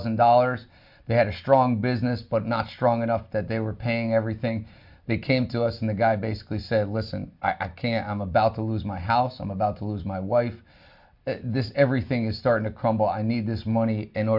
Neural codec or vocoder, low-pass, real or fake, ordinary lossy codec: none; 5.4 kHz; real; MP3, 48 kbps